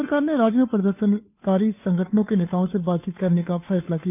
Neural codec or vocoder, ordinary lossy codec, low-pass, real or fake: codec, 16 kHz, 2 kbps, FunCodec, trained on Chinese and English, 25 frames a second; none; 3.6 kHz; fake